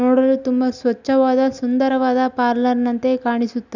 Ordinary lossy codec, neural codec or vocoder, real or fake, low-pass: none; none; real; 7.2 kHz